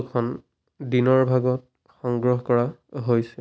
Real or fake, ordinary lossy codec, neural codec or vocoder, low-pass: real; none; none; none